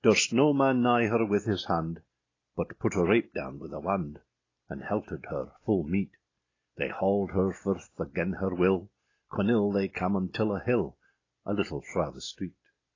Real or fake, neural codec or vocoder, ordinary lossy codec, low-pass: real; none; AAC, 32 kbps; 7.2 kHz